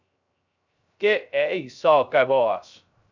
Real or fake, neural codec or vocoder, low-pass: fake; codec, 16 kHz, 0.3 kbps, FocalCodec; 7.2 kHz